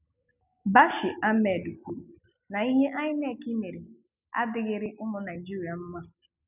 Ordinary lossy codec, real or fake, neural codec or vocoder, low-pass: none; real; none; 3.6 kHz